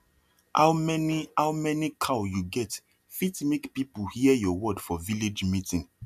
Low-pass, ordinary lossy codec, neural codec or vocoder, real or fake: 14.4 kHz; none; none; real